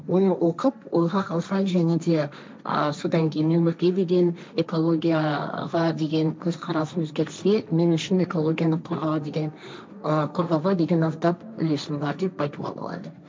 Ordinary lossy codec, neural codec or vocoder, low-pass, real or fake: none; codec, 16 kHz, 1.1 kbps, Voila-Tokenizer; none; fake